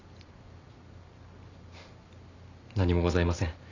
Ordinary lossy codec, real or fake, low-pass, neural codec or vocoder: none; real; 7.2 kHz; none